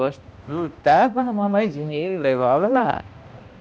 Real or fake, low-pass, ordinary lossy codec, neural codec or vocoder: fake; none; none; codec, 16 kHz, 1 kbps, X-Codec, HuBERT features, trained on balanced general audio